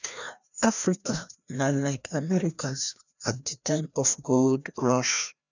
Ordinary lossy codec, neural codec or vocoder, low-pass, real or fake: none; codec, 16 kHz, 1 kbps, FreqCodec, larger model; 7.2 kHz; fake